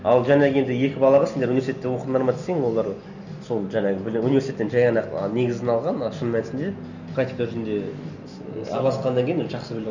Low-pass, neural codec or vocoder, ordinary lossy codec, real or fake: 7.2 kHz; none; none; real